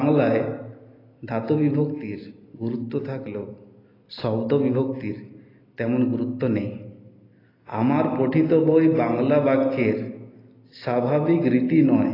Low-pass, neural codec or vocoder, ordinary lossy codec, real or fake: 5.4 kHz; none; AAC, 24 kbps; real